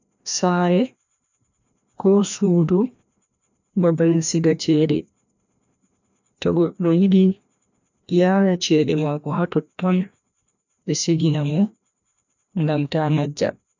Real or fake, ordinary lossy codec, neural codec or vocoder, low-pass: fake; none; codec, 16 kHz, 1 kbps, FreqCodec, larger model; 7.2 kHz